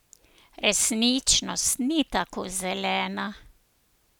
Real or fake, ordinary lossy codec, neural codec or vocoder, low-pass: real; none; none; none